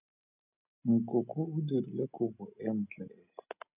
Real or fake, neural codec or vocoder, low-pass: real; none; 3.6 kHz